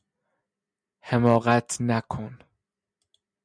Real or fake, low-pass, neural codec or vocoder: real; 9.9 kHz; none